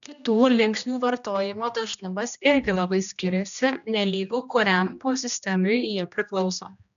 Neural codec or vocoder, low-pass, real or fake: codec, 16 kHz, 1 kbps, X-Codec, HuBERT features, trained on general audio; 7.2 kHz; fake